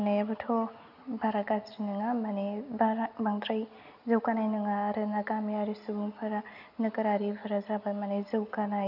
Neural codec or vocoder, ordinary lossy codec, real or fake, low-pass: none; none; real; 5.4 kHz